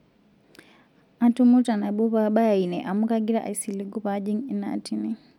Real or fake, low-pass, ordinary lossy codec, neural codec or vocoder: real; 19.8 kHz; none; none